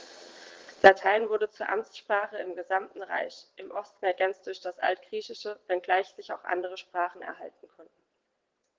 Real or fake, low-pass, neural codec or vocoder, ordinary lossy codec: fake; 7.2 kHz; vocoder, 22.05 kHz, 80 mel bands, WaveNeXt; Opus, 16 kbps